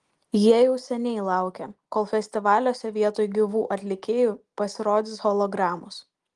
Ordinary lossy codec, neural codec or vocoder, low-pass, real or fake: Opus, 24 kbps; none; 10.8 kHz; real